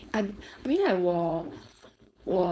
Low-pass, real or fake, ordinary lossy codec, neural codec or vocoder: none; fake; none; codec, 16 kHz, 4.8 kbps, FACodec